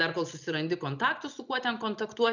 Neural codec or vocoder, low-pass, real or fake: none; 7.2 kHz; real